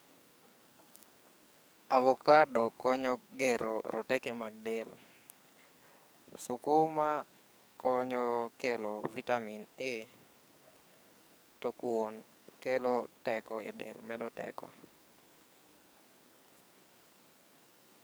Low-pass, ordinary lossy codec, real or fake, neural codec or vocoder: none; none; fake; codec, 44.1 kHz, 2.6 kbps, SNAC